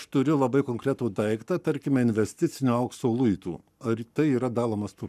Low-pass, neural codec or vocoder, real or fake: 14.4 kHz; codec, 44.1 kHz, 7.8 kbps, Pupu-Codec; fake